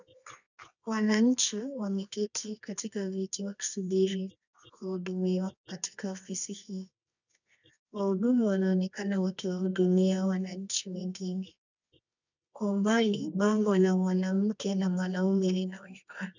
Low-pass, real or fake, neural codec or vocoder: 7.2 kHz; fake; codec, 24 kHz, 0.9 kbps, WavTokenizer, medium music audio release